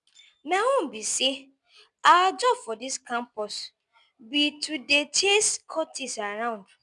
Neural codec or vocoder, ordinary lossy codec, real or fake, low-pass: none; none; real; 10.8 kHz